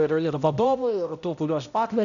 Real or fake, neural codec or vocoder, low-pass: fake; codec, 16 kHz, 0.5 kbps, X-Codec, HuBERT features, trained on balanced general audio; 7.2 kHz